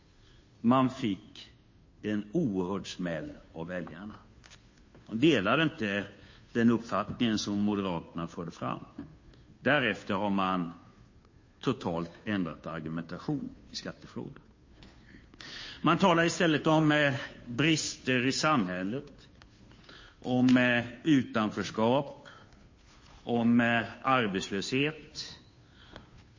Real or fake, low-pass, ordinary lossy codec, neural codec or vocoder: fake; 7.2 kHz; MP3, 32 kbps; codec, 16 kHz, 2 kbps, FunCodec, trained on Chinese and English, 25 frames a second